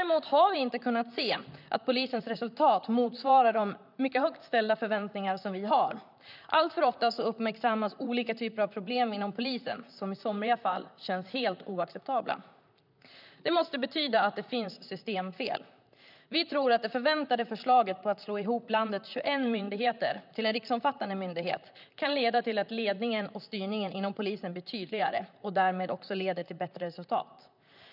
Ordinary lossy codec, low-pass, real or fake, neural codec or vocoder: none; 5.4 kHz; fake; vocoder, 44.1 kHz, 128 mel bands, Pupu-Vocoder